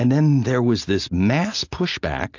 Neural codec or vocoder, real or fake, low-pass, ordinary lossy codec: none; real; 7.2 kHz; AAC, 48 kbps